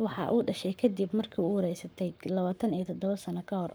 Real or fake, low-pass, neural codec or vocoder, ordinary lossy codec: fake; none; codec, 44.1 kHz, 7.8 kbps, Pupu-Codec; none